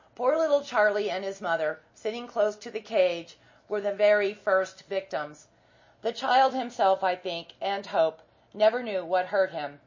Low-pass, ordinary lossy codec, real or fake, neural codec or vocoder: 7.2 kHz; MP3, 32 kbps; real; none